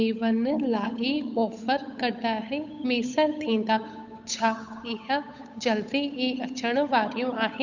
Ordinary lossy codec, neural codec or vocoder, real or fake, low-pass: none; codec, 16 kHz, 8 kbps, FunCodec, trained on Chinese and English, 25 frames a second; fake; 7.2 kHz